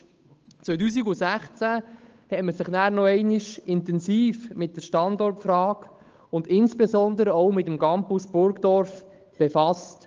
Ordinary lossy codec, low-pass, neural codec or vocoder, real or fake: Opus, 24 kbps; 7.2 kHz; codec, 16 kHz, 8 kbps, FunCodec, trained on Chinese and English, 25 frames a second; fake